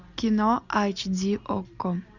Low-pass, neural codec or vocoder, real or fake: 7.2 kHz; none; real